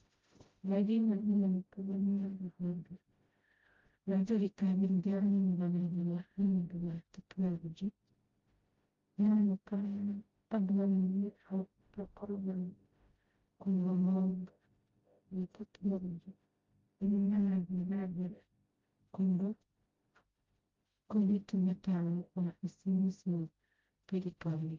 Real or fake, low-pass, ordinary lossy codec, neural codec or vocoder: fake; 7.2 kHz; Opus, 32 kbps; codec, 16 kHz, 0.5 kbps, FreqCodec, smaller model